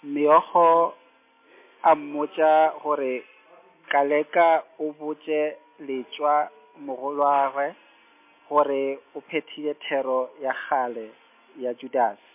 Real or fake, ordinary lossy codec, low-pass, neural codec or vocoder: real; MP3, 24 kbps; 3.6 kHz; none